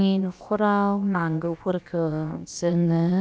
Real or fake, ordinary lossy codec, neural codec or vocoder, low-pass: fake; none; codec, 16 kHz, about 1 kbps, DyCAST, with the encoder's durations; none